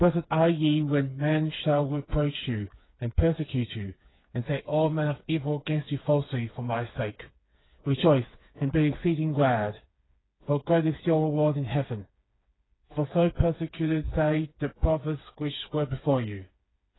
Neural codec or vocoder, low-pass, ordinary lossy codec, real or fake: codec, 16 kHz, 4 kbps, FreqCodec, smaller model; 7.2 kHz; AAC, 16 kbps; fake